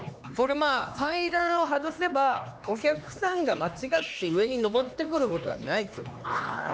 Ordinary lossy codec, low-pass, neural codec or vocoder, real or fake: none; none; codec, 16 kHz, 2 kbps, X-Codec, HuBERT features, trained on LibriSpeech; fake